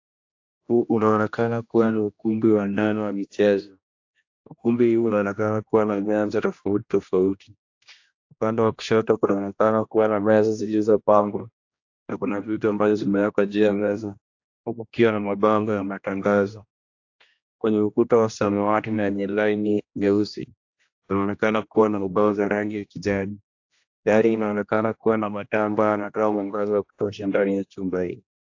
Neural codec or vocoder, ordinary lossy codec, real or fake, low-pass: codec, 16 kHz, 1 kbps, X-Codec, HuBERT features, trained on general audio; AAC, 48 kbps; fake; 7.2 kHz